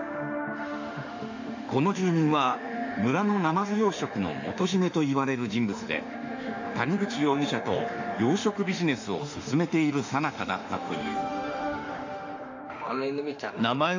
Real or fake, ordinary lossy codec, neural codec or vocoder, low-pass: fake; AAC, 48 kbps; autoencoder, 48 kHz, 32 numbers a frame, DAC-VAE, trained on Japanese speech; 7.2 kHz